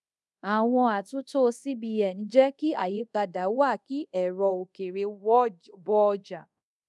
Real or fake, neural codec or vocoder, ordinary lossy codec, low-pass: fake; codec, 24 kHz, 0.5 kbps, DualCodec; none; none